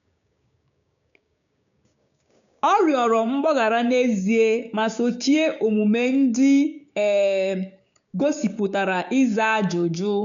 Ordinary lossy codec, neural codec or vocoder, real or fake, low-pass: none; codec, 16 kHz, 6 kbps, DAC; fake; 7.2 kHz